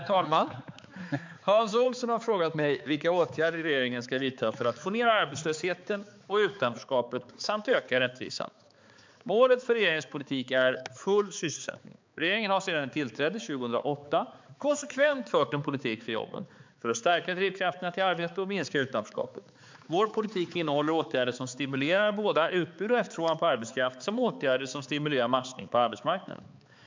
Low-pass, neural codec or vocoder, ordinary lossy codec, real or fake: 7.2 kHz; codec, 16 kHz, 4 kbps, X-Codec, HuBERT features, trained on balanced general audio; MP3, 64 kbps; fake